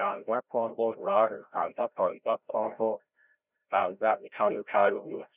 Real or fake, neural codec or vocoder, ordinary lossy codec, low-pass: fake; codec, 16 kHz, 0.5 kbps, FreqCodec, larger model; none; 3.6 kHz